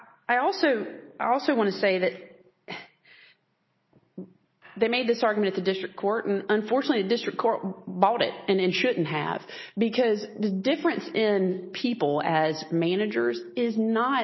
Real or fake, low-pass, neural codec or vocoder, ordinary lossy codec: real; 7.2 kHz; none; MP3, 24 kbps